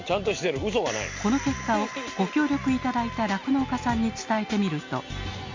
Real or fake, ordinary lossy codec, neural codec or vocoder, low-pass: real; MP3, 48 kbps; none; 7.2 kHz